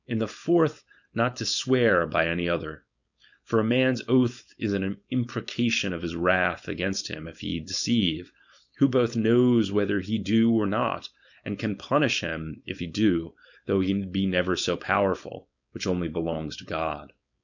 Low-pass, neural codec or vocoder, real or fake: 7.2 kHz; codec, 16 kHz, 4.8 kbps, FACodec; fake